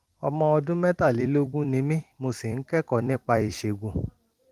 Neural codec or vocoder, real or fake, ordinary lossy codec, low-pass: vocoder, 44.1 kHz, 128 mel bands every 256 samples, BigVGAN v2; fake; Opus, 24 kbps; 14.4 kHz